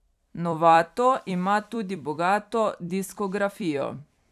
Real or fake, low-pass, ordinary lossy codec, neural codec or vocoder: fake; 14.4 kHz; none; vocoder, 44.1 kHz, 128 mel bands every 256 samples, BigVGAN v2